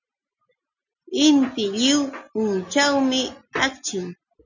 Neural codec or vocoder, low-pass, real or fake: none; 7.2 kHz; real